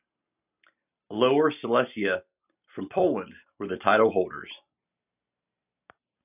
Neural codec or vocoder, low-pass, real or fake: none; 3.6 kHz; real